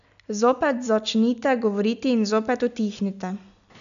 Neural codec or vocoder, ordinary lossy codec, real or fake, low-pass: none; none; real; 7.2 kHz